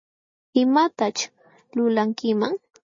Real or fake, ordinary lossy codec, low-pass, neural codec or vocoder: real; MP3, 32 kbps; 7.2 kHz; none